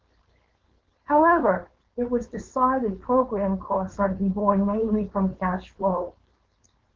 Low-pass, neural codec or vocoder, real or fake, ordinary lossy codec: 7.2 kHz; codec, 16 kHz, 4.8 kbps, FACodec; fake; Opus, 16 kbps